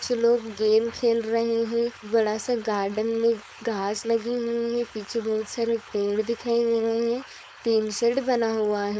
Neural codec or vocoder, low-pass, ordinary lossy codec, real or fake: codec, 16 kHz, 4.8 kbps, FACodec; none; none; fake